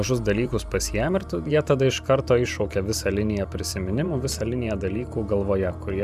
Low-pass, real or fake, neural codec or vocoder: 14.4 kHz; fake; vocoder, 44.1 kHz, 128 mel bands every 512 samples, BigVGAN v2